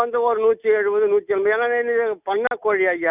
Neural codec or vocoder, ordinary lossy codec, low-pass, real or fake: none; none; 3.6 kHz; real